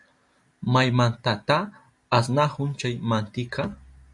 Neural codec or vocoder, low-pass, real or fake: none; 10.8 kHz; real